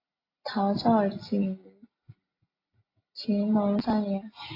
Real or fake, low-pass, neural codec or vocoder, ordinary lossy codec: real; 5.4 kHz; none; AAC, 24 kbps